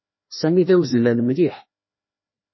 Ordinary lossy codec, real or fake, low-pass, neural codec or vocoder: MP3, 24 kbps; fake; 7.2 kHz; codec, 16 kHz, 1 kbps, FreqCodec, larger model